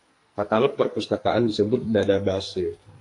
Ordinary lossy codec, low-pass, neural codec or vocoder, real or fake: AAC, 48 kbps; 10.8 kHz; codec, 44.1 kHz, 2.6 kbps, SNAC; fake